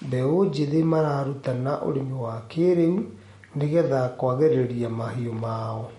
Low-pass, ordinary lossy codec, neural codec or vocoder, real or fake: 19.8 kHz; MP3, 48 kbps; none; real